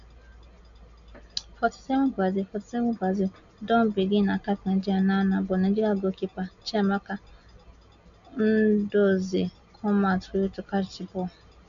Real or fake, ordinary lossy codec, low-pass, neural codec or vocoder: real; none; 7.2 kHz; none